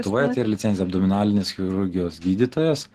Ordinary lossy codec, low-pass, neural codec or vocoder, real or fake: Opus, 16 kbps; 14.4 kHz; none; real